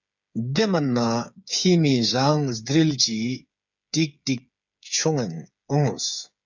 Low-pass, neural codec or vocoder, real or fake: 7.2 kHz; codec, 16 kHz, 8 kbps, FreqCodec, smaller model; fake